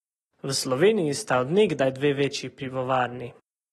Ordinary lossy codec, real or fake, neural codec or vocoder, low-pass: AAC, 32 kbps; real; none; 19.8 kHz